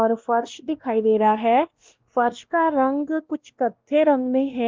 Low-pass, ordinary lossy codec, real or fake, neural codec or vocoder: 7.2 kHz; Opus, 32 kbps; fake; codec, 16 kHz, 1 kbps, X-Codec, WavLM features, trained on Multilingual LibriSpeech